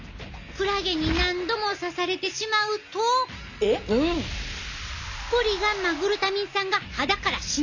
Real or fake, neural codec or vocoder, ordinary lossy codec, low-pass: real; none; none; 7.2 kHz